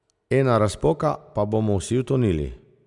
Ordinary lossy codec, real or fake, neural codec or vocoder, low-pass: none; real; none; 10.8 kHz